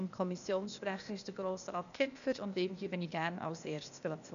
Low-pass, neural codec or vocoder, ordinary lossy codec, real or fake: 7.2 kHz; codec, 16 kHz, 0.8 kbps, ZipCodec; none; fake